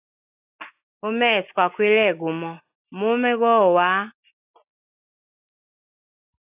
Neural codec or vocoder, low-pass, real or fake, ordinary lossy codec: none; 3.6 kHz; real; AAC, 32 kbps